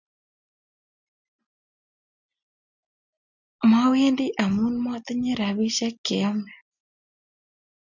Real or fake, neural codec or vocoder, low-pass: real; none; 7.2 kHz